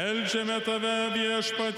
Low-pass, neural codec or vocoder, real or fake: 19.8 kHz; none; real